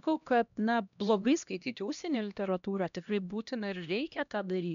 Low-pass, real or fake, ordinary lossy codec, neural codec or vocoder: 7.2 kHz; fake; MP3, 96 kbps; codec, 16 kHz, 1 kbps, X-Codec, HuBERT features, trained on LibriSpeech